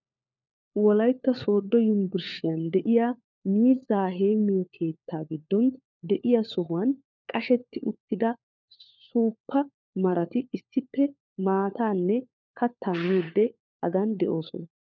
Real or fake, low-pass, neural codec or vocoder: fake; 7.2 kHz; codec, 16 kHz, 4 kbps, FunCodec, trained on LibriTTS, 50 frames a second